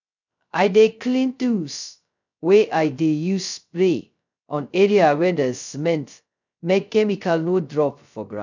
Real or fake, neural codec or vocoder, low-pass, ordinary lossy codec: fake; codec, 16 kHz, 0.2 kbps, FocalCodec; 7.2 kHz; none